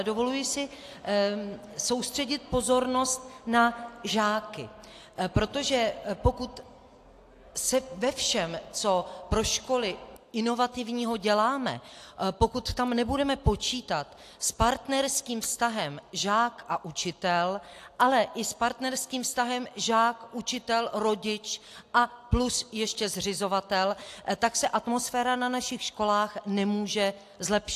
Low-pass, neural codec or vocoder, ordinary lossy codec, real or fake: 14.4 kHz; none; AAC, 64 kbps; real